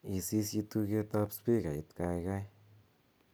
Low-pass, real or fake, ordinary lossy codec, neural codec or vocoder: none; real; none; none